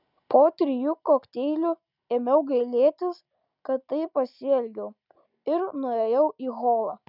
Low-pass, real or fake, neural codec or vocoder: 5.4 kHz; real; none